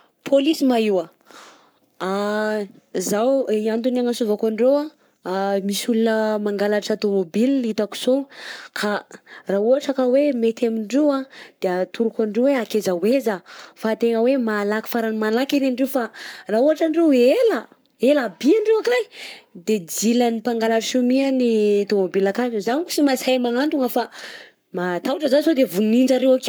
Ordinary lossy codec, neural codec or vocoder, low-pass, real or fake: none; codec, 44.1 kHz, 7.8 kbps, Pupu-Codec; none; fake